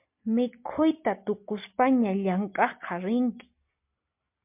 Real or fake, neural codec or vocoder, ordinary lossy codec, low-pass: real; none; MP3, 32 kbps; 3.6 kHz